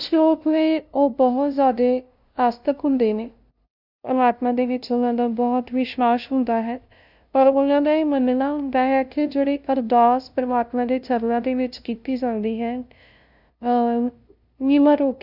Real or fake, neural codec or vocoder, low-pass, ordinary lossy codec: fake; codec, 16 kHz, 0.5 kbps, FunCodec, trained on LibriTTS, 25 frames a second; 5.4 kHz; none